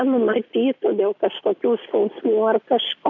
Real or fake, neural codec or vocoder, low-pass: real; none; 7.2 kHz